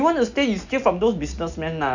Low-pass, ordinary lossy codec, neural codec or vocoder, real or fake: 7.2 kHz; none; none; real